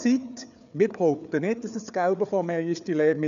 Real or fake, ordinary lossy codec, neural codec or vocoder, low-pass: fake; none; codec, 16 kHz, 4 kbps, FreqCodec, larger model; 7.2 kHz